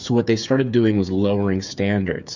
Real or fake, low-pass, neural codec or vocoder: fake; 7.2 kHz; codec, 16 kHz, 8 kbps, FreqCodec, smaller model